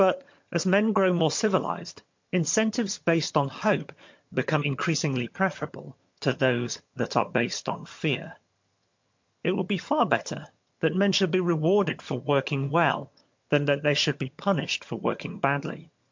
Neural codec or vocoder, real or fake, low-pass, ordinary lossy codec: vocoder, 22.05 kHz, 80 mel bands, HiFi-GAN; fake; 7.2 kHz; MP3, 48 kbps